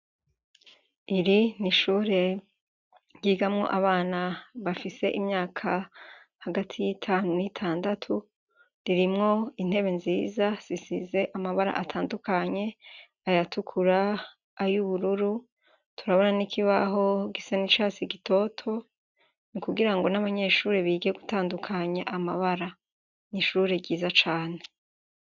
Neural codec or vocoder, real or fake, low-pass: none; real; 7.2 kHz